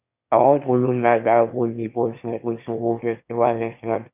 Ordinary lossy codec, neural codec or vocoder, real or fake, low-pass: none; autoencoder, 22.05 kHz, a latent of 192 numbers a frame, VITS, trained on one speaker; fake; 3.6 kHz